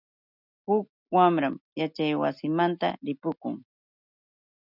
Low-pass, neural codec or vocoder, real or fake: 5.4 kHz; none; real